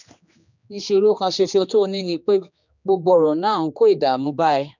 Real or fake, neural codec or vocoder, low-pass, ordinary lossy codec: fake; codec, 16 kHz, 2 kbps, X-Codec, HuBERT features, trained on general audio; 7.2 kHz; none